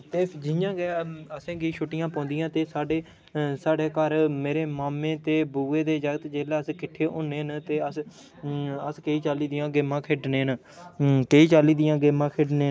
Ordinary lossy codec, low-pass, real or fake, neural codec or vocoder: none; none; real; none